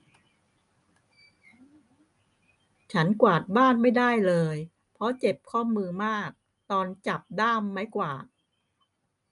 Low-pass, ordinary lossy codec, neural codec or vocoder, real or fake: 10.8 kHz; none; none; real